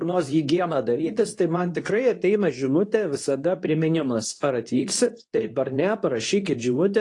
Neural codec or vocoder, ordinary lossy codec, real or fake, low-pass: codec, 24 kHz, 0.9 kbps, WavTokenizer, medium speech release version 2; AAC, 48 kbps; fake; 10.8 kHz